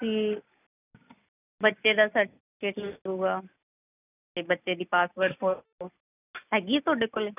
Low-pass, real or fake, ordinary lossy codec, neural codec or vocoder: 3.6 kHz; real; none; none